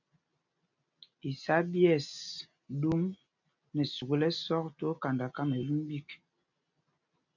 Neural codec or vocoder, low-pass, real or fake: none; 7.2 kHz; real